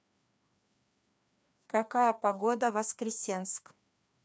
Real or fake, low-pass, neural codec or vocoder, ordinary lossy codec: fake; none; codec, 16 kHz, 2 kbps, FreqCodec, larger model; none